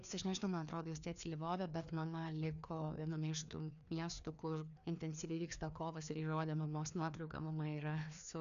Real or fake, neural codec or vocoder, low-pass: fake; codec, 16 kHz, 2 kbps, FreqCodec, larger model; 7.2 kHz